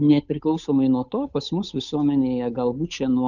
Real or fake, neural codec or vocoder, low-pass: fake; codec, 16 kHz, 8 kbps, FunCodec, trained on Chinese and English, 25 frames a second; 7.2 kHz